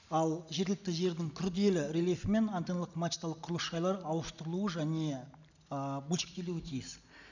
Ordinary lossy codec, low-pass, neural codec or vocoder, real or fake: none; 7.2 kHz; none; real